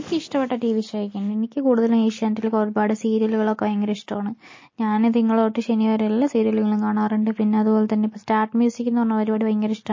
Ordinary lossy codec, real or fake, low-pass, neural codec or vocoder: MP3, 32 kbps; real; 7.2 kHz; none